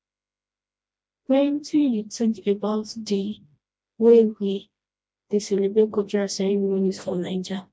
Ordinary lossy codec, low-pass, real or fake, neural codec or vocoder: none; none; fake; codec, 16 kHz, 1 kbps, FreqCodec, smaller model